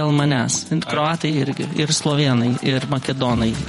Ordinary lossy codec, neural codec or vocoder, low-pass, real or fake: MP3, 48 kbps; vocoder, 48 kHz, 128 mel bands, Vocos; 19.8 kHz; fake